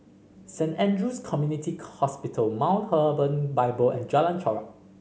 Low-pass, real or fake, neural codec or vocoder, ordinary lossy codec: none; real; none; none